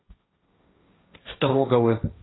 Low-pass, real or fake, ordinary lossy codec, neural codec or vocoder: 7.2 kHz; fake; AAC, 16 kbps; codec, 16 kHz, 1.1 kbps, Voila-Tokenizer